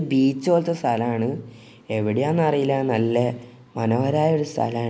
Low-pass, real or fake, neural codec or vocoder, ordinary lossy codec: none; real; none; none